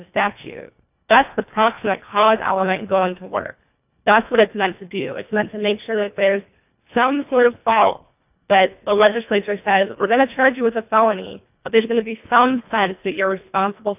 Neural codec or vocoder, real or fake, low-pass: codec, 24 kHz, 1.5 kbps, HILCodec; fake; 3.6 kHz